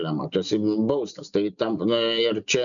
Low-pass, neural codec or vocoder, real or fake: 7.2 kHz; none; real